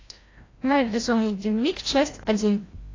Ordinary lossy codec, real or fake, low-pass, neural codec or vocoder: AAC, 32 kbps; fake; 7.2 kHz; codec, 16 kHz, 0.5 kbps, FreqCodec, larger model